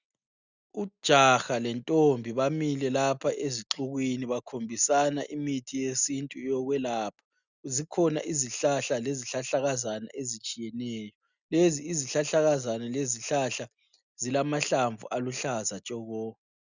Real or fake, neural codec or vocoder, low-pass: real; none; 7.2 kHz